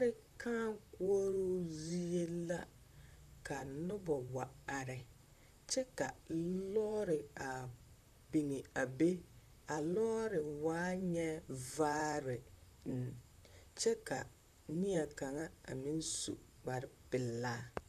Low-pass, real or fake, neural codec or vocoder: 14.4 kHz; fake; vocoder, 44.1 kHz, 128 mel bands, Pupu-Vocoder